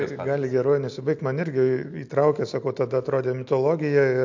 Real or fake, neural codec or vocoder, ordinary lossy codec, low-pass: real; none; MP3, 48 kbps; 7.2 kHz